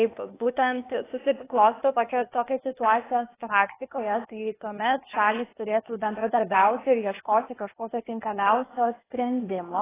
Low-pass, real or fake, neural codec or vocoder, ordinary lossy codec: 3.6 kHz; fake; codec, 16 kHz, 0.8 kbps, ZipCodec; AAC, 16 kbps